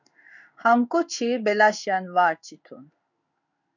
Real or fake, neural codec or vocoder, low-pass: fake; codec, 16 kHz in and 24 kHz out, 1 kbps, XY-Tokenizer; 7.2 kHz